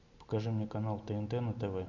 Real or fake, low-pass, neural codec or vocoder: real; 7.2 kHz; none